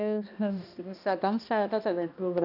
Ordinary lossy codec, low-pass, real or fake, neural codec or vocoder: none; 5.4 kHz; fake; codec, 16 kHz, 1 kbps, X-Codec, HuBERT features, trained on balanced general audio